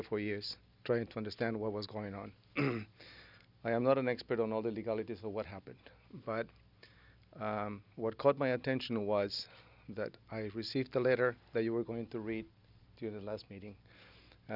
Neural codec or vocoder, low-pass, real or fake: none; 5.4 kHz; real